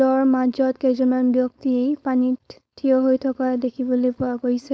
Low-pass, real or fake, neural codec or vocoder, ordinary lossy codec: none; fake; codec, 16 kHz, 4.8 kbps, FACodec; none